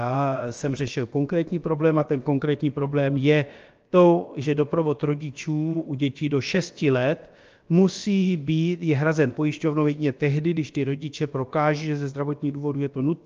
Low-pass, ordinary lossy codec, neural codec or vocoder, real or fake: 7.2 kHz; Opus, 24 kbps; codec, 16 kHz, about 1 kbps, DyCAST, with the encoder's durations; fake